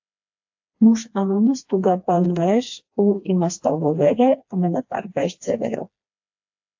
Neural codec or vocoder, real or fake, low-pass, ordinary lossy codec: codec, 16 kHz, 2 kbps, FreqCodec, smaller model; fake; 7.2 kHz; AAC, 48 kbps